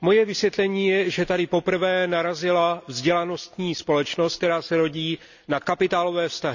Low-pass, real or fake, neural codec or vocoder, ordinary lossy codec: 7.2 kHz; real; none; none